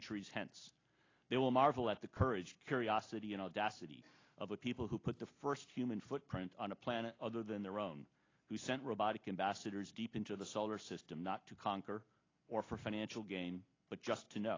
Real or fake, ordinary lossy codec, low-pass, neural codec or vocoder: real; AAC, 32 kbps; 7.2 kHz; none